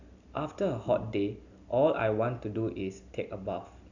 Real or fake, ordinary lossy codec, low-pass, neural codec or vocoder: real; none; 7.2 kHz; none